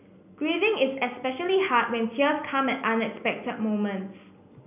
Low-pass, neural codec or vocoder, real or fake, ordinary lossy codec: 3.6 kHz; none; real; none